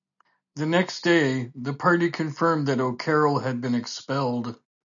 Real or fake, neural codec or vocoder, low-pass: real; none; 7.2 kHz